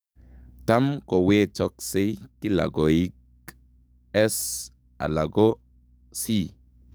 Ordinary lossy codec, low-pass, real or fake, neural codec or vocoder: none; none; fake; codec, 44.1 kHz, 7.8 kbps, DAC